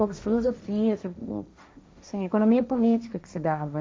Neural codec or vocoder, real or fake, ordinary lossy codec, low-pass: codec, 16 kHz, 1.1 kbps, Voila-Tokenizer; fake; none; none